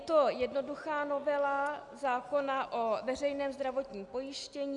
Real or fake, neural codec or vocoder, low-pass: real; none; 10.8 kHz